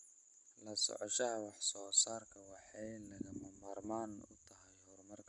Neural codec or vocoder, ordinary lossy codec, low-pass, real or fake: none; none; 10.8 kHz; real